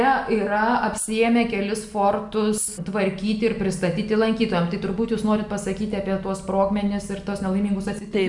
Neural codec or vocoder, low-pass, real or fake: none; 10.8 kHz; real